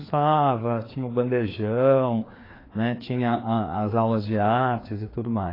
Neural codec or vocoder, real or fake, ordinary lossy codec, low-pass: codec, 16 kHz, 2 kbps, FreqCodec, larger model; fake; AAC, 24 kbps; 5.4 kHz